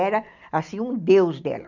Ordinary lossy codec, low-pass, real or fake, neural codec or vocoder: none; 7.2 kHz; real; none